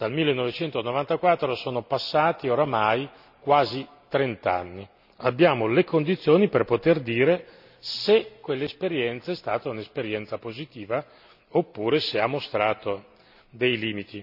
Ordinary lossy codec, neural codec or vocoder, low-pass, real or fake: none; none; 5.4 kHz; real